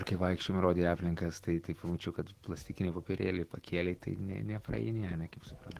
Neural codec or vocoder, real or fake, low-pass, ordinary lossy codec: autoencoder, 48 kHz, 128 numbers a frame, DAC-VAE, trained on Japanese speech; fake; 14.4 kHz; Opus, 16 kbps